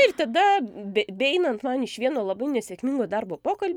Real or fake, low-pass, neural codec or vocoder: real; 19.8 kHz; none